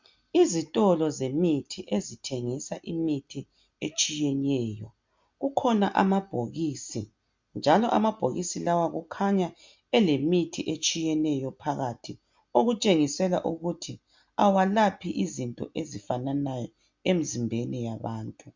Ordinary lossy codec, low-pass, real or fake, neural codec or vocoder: MP3, 64 kbps; 7.2 kHz; real; none